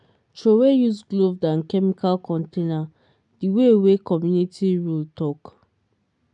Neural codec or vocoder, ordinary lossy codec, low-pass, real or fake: none; none; 10.8 kHz; real